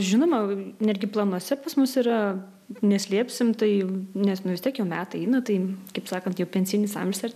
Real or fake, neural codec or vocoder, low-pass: real; none; 14.4 kHz